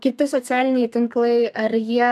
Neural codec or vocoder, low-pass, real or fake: codec, 32 kHz, 1.9 kbps, SNAC; 14.4 kHz; fake